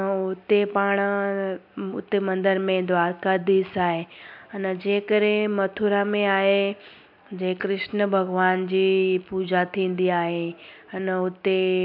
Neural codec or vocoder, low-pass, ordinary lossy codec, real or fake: none; 5.4 kHz; MP3, 48 kbps; real